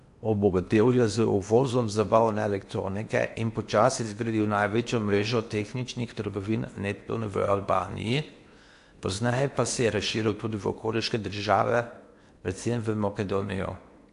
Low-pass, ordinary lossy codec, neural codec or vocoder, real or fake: 10.8 kHz; AAC, 64 kbps; codec, 16 kHz in and 24 kHz out, 0.8 kbps, FocalCodec, streaming, 65536 codes; fake